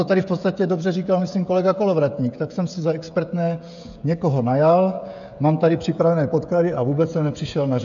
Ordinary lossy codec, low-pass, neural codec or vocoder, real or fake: AAC, 64 kbps; 7.2 kHz; codec, 16 kHz, 16 kbps, FreqCodec, smaller model; fake